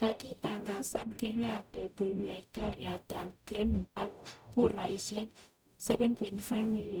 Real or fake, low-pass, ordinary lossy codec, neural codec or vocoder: fake; none; none; codec, 44.1 kHz, 0.9 kbps, DAC